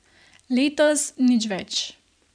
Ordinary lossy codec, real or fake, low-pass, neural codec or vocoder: none; fake; 9.9 kHz; vocoder, 44.1 kHz, 128 mel bands every 512 samples, BigVGAN v2